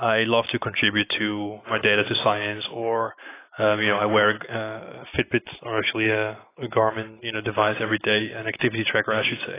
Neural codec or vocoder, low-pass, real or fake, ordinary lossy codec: none; 3.6 kHz; real; AAC, 16 kbps